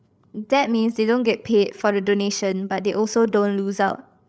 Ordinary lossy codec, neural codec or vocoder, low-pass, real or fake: none; codec, 16 kHz, 8 kbps, FreqCodec, larger model; none; fake